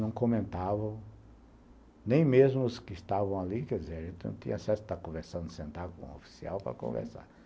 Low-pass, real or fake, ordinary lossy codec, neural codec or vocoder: none; real; none; none